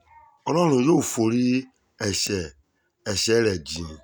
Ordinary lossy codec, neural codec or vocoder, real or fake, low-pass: none; none; real; none